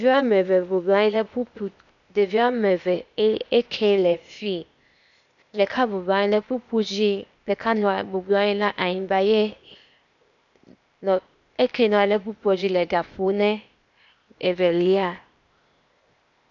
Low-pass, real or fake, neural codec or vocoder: 7.2 kHz; fake; codec, 16 kHz, 0.8 kbps, ZipCodec